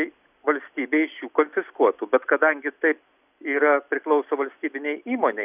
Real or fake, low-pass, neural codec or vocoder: real; 3.6 kHz; none